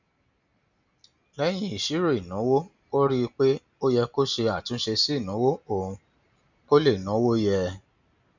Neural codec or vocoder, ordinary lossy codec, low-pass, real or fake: none; none; 7.2 kHz; real